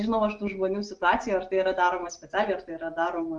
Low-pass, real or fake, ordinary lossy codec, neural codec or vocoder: 9.9 kHz; real; Opus, 24 kbps; none